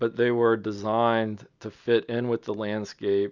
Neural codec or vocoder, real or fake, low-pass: none; real; 7.2 kHz